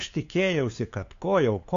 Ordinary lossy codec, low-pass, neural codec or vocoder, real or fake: AAC, 48 kbps; 7.2 kHz; codec, 16 kHz, 2 kbps, FunCodec, trained on LibriTTS, 25 frames a second; fake